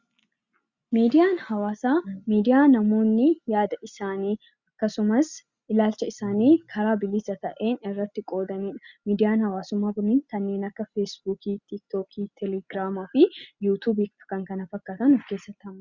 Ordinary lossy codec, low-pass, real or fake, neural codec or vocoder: Opus, 64 kbps; 7.2 kHz; real; none